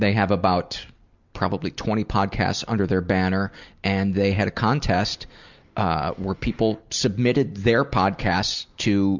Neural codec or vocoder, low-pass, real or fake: none; 7.2 kHz; real